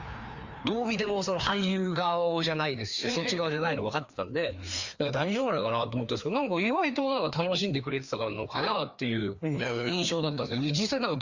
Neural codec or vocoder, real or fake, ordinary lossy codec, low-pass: codec, 16 kHz, 2 kbps, FreqCodec, larger model; fake; Opus, 64 kbps; 7.2 kHz